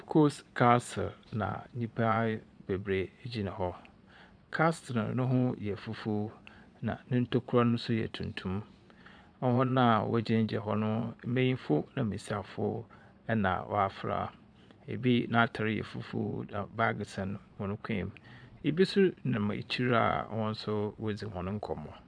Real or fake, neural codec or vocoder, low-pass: fake; vocoder, 22.05 kHz, 80 mel bands, Vocos; 9.9 kHz